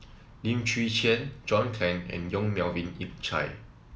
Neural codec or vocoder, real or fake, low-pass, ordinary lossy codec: none; real; none; none